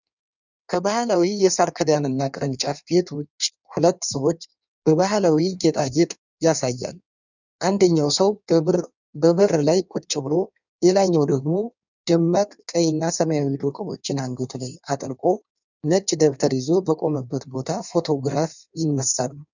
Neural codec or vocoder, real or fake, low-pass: codec, 16 kHz in and 24 kHz out, 1.1 kbps, FireRedTTS-2 codec; fake; 7.2 kHz